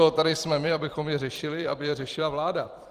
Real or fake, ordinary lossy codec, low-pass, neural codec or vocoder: fake; Opus, 24 kbps; 14.4 kHz; vocoder, 44.1 kHz, 128 mel bands every 256 samples, BigVGAN v2